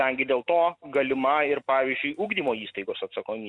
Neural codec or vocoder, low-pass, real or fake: none; 10.8 kHz; real